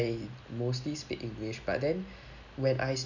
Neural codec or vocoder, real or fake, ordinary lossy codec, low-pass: none; real; none; 7.2 kHz